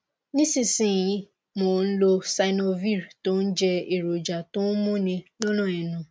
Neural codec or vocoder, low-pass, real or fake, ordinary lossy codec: none; none; real; none